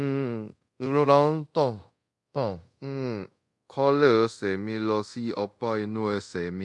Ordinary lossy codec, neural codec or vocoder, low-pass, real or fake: MP3, 64 kbps; codec, 24 kHz, 0.5 kbps, DualCodec; 10.8 kHz; fake